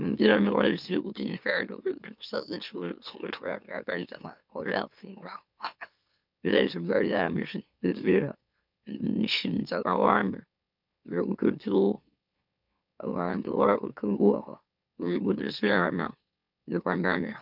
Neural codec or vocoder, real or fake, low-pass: autoencoder, 44.1 kHz, a latent of 192 numbers a frame, MeloTTS; fake; 5.4 kHz